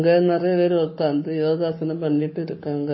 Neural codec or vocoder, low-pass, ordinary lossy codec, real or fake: codec, 44.1 kHz, 7.8 kbps, Pupu-Codec; 7.2 kHz; MP3, 24 kbps; fake